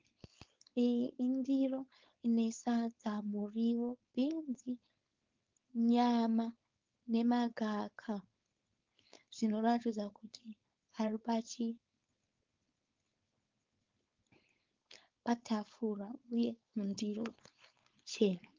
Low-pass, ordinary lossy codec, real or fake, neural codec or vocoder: 7.2 kHz; Opus, 32 kbps; fake; codec, 16 kHz, 4.8 kbps, FACodec